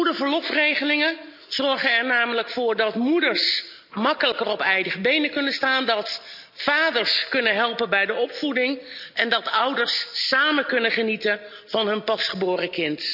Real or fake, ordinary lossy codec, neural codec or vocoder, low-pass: real; none; none; 5.4 kHz